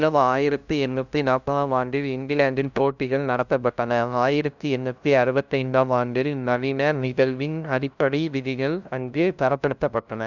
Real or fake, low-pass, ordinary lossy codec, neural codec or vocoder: fake; 7.2 kHz; none; codec, 16 kHz, 0.5 kbps, FunCodec, trained on LibriTTS, 25 frames a second